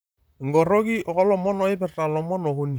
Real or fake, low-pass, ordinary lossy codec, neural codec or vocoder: fake; none; none; vocoder, 44.1 kHz, 128 mel bands, Pupu-Vocoder